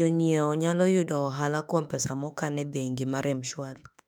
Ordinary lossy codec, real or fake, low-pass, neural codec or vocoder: none; fake; 19.8 kHz; autoencoder, 48 kHz, 32 numbers a frame, DAC-VAE, trained on Japanese speech